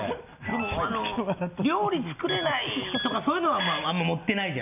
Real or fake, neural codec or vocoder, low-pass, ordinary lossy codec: real; none; 3.6 kHz; none